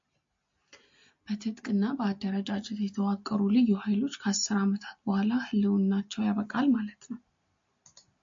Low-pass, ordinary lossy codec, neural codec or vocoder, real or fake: 7.2 kHz; MP3, 48 kbps; none; real